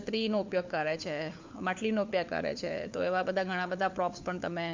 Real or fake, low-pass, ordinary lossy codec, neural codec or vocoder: fake; 7.2 kHz; MP3, 64 kbps; codec, 16 kHz, 4 kbps, FunCodec, trained on LibriTTS, 50 frames a second